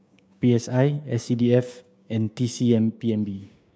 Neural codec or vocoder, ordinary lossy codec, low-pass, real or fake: codec, 16 kHz, 6 kbps, DAC; none; none; fake